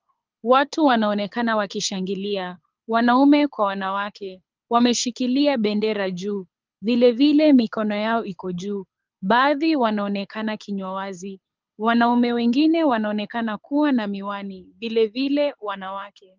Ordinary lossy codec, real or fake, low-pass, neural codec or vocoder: Opus, 24 kbps; fake; 7.2 kHz; codec, 24 kHz, 6 kbps, HILCodec